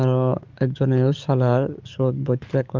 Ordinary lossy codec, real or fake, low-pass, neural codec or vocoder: Opus, 32 kbps; fake; 7.2 kHz; codec, 44.1 kHz, 7.8 kbps, DAC